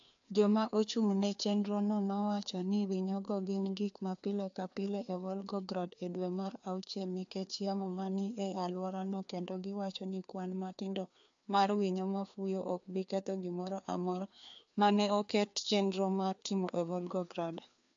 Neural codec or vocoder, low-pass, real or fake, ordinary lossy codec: codec, 16 kHz, 2 kbps, FreqCodec, larger model; 7.2 kHz; fake; AAC, 64 kbps